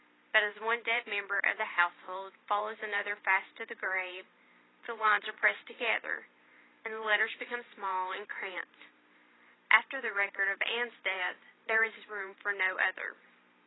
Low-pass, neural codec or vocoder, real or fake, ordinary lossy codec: 7.2 kHz; vocoder, 44.1 kHz, 128 mel bands every 512 samples, BigVGAN v2; fake; AAC, 16 kbps